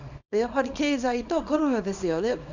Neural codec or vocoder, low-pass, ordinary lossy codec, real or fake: codec, 24 kHz, 0.9 kbps, WavTokenizer, small release; 7.2 kHz; none; fake